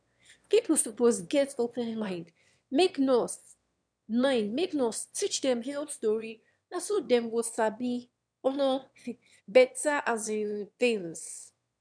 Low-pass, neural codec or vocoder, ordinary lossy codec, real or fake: 9.9 kHz; autoencoder, 22.05 kHz, a latent of 192 numbers a frame, VITS, trained on one speaker; none; fake